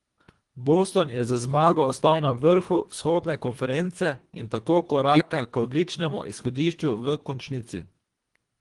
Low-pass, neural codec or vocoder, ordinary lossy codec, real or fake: 10.8 kHz; codec, 24 kHz, 1.5 kbps, HILCodec; Opus, 24 kbps; fake